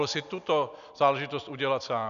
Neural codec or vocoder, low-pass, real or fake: none; 7.2 kHz; real